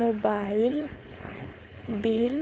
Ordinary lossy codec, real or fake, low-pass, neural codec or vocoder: none; fake; none; codec, 16 kHz, 4.8 kbps, FACodec